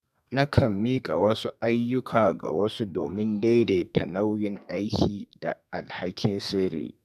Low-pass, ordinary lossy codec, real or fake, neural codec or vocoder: 14.4 kHz; none; fake; codec, 32 kHz, 1.9 kbps, SNAC